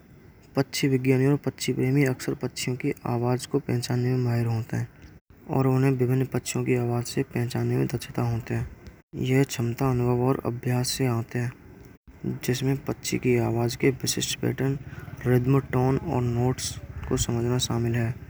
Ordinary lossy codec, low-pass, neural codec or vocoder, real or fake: none; none; none; real